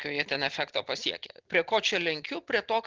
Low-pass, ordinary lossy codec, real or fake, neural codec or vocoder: 7.2 kHz; Opus, 16 kbps; real; none